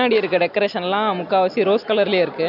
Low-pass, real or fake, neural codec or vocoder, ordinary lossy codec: 5.4 kHz; real; none; none